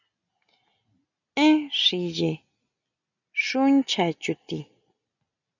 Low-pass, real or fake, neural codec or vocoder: 7.2 kHz; real; none